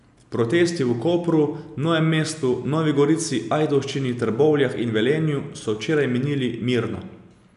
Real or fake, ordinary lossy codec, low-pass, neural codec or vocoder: real; none; 10.8 kHz; none